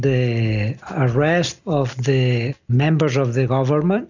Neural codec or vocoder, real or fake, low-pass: none; real; 7.2 kHz